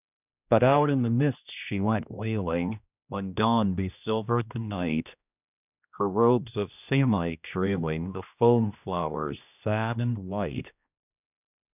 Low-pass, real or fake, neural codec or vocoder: 3.6 kHz; fake; codec, 16 kHz, 1 kbps, X-Codec, HuBERT features, trained on general audio